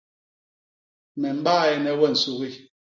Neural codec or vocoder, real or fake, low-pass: none; real; 7.2 kHz